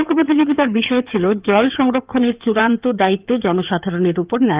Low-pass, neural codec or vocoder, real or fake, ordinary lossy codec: 3.6 kHz; codec, 16 kHz in and 24 kHz out, 2.2 kbps, FireRedTTS-2 codec; fake; Opus, 24 kbps